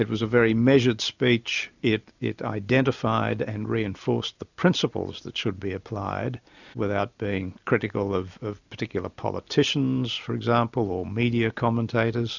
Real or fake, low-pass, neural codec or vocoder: real; 7.2 kHz; none